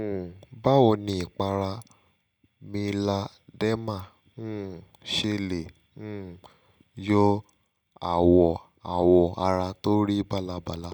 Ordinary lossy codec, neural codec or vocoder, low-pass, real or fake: none; none; 19.8 kHz; real